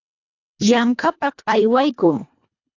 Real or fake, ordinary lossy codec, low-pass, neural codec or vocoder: fake; AAC, 48 kbps; 7.2 kHz; codec, 24 kHz, 1.5 kbps, HILCodec